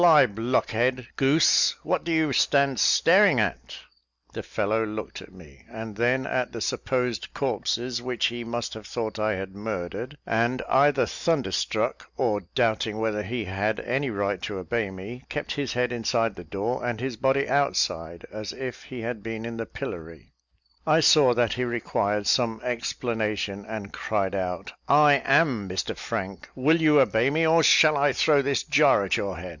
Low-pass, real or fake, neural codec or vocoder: 7.2 kHz; real; none